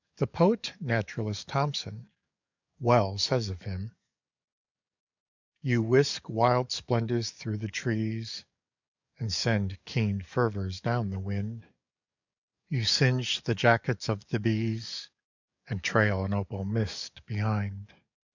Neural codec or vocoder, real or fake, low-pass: codec, 44.1 kHz, 7.8 kbps, DAC; fake; 7.2 kHz